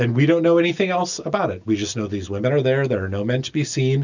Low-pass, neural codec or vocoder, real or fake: 7.2 kHz; none; real